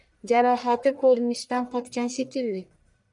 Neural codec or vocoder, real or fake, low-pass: codec, 44.1 kHz, 1.7 kbps, Pupu-Codec; fake; 10.8 kHz